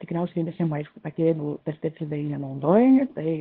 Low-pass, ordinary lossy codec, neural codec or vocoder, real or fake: 5.4 kHz; Opus, 16 kbps; codec, 24 kHz, 0.9 kbps, WavTokenizer, small release; fake